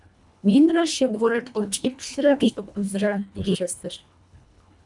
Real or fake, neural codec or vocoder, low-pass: fake; codec, 24 kHz, 1.5 kbps, HILCodec; 10.8 kHz